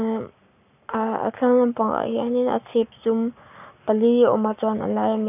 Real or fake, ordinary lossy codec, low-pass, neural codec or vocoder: fake; none; 3.6 kHz; codec, 44.1 kHz, 7.8 kbps, Pupu-Codec